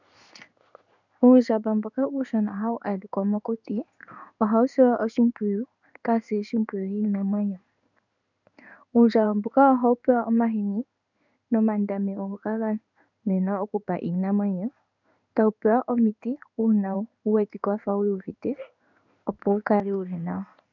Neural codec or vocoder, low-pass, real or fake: codec, 16 kHz in and 24 kHz out, 1 kbps, XY-Tokenizer; 7.2 kHz; fake